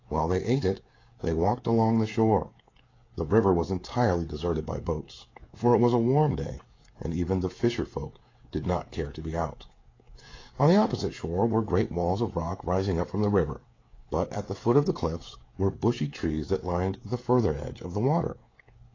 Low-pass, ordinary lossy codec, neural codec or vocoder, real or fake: 7.2 kHz; AAC, 32 kbps; codec, 16 kHz, 16 kbps, FreqCodec, smaller model; fake